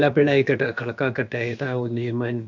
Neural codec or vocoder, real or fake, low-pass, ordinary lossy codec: codec, 16 kHz, about 1 kbps, DyCAST, with the encoder's durations; fake; 7.2 kHz; MP3, 64 kbps